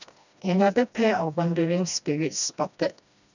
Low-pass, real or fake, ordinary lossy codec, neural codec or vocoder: 7.2 kHz; fake; none; codec, 16 kHz, 1 kbps, FreqCodec, smaller model